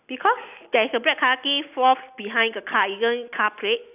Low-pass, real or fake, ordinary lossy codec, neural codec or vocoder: 3.6 kHz; real; none; none